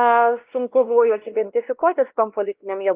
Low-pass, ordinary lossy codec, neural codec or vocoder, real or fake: 3.6 kHz; Opus, 24 kbps; codec, 16 kHz, 1 kbps, X-Codec, WavLM features, trained on Multilingual LibriSpeech; fake